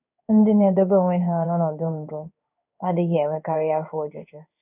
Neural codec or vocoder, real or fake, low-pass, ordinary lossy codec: codec, 16 kHz in and 24 kHz out, 1 kbps, XY-Tokenizer; fake; 3.6 kHz; none